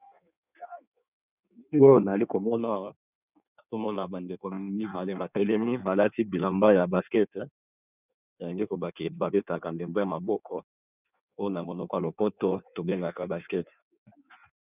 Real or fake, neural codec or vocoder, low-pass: fake; codec, 16 kHz in and 24 kHz out, 1.1 kbps, FireRedTTS-2 codec; 3.6 kHz